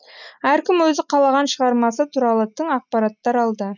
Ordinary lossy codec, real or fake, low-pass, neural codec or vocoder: none; real; 7.2 kHz; none